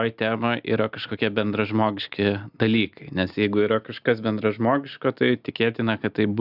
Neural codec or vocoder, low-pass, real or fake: none; 5.4 kHz; real